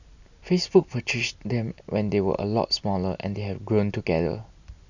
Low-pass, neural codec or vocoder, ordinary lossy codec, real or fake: 7.2 kHz; none; none; real